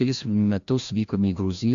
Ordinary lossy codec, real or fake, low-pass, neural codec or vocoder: AAC, 64 kbps; fake; 7.2 kHz; codec, 16 kHz, 1 kbps, FreqCodec, larger model